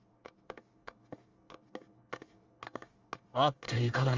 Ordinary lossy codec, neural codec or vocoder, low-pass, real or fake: Opus, 32 kbps; codec, 24 kHz, 1 kbps, SNAC; 7.2 kHz; fake